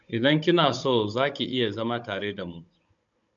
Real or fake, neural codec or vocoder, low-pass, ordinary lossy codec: fake; codec, 16 kHz, 16 kbps, FunCodec, trained on Chinese and English, 50 frames a second; 7.2 kHz; MP3, 64 kbps